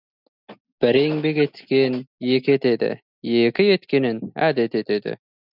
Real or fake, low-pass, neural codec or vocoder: real; 5.4 kHz; none